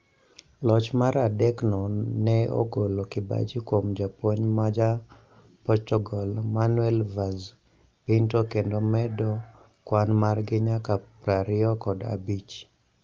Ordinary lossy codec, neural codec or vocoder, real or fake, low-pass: Opus, 32 kbps; none; real; 7.2 kHz